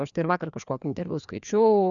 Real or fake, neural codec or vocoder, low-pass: fake; codec, 16 kHz, 2 kbps, FreqCodec, larger model; 7.2 kHz